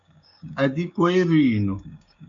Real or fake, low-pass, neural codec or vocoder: fake; 7.2 kHz; codec, 16 kHz, 6 kbps, DAC